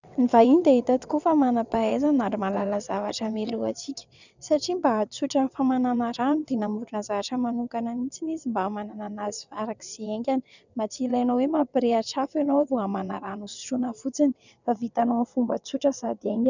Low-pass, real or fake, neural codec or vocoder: 7.2 kHz; fake; vocoder, 22.05 kHz, 80 mel bands, WaveNeXt